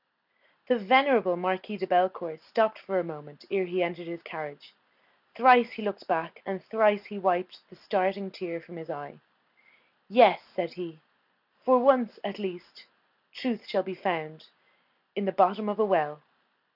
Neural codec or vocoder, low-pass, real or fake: none; 5.4 kHz; real